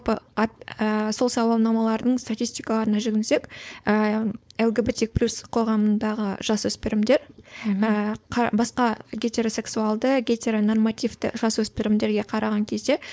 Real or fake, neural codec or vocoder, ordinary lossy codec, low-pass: fake; codec, 16 kHz, 4.8 kbps, FACodec; none; none